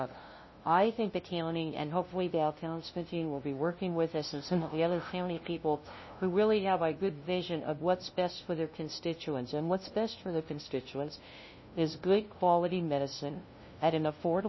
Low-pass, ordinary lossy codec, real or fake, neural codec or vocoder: 7.2 kHz; MP3, 24 kbps; fake; codec, 16 kHz, 0.5 kbps, FunCodec, trained on LibriTTS, 25 frames a second